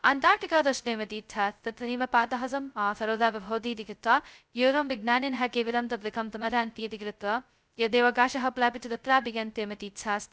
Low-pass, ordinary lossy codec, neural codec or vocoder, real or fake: none; none; codec, 16 kHz, 0.2 kbps, FocalCodec; fake